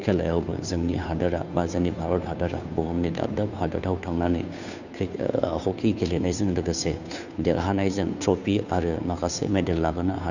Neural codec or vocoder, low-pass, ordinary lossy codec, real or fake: codec, 16 kHz, 2 kbps, FunCodec, trained on Chinese and English, 25 frames a second; 7.2 kHz; none; fake